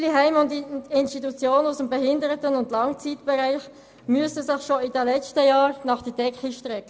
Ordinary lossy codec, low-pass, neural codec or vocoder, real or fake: none; none; none; real